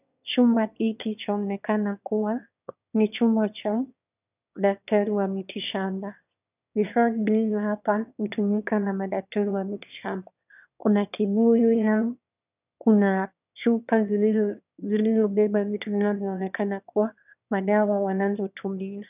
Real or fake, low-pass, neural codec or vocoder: fake; 3.6 kHz; autoencoder, 22.05 kHz, a latent of 192 numbers a frame, VITS, trained on one speaker